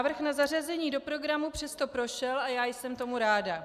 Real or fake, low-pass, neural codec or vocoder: real; 14.4 kHz; none